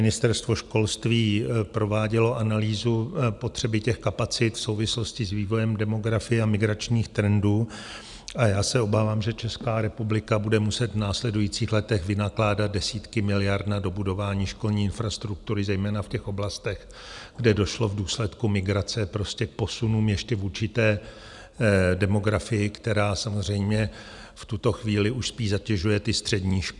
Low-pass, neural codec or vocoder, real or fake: 10.8 kHz; none; real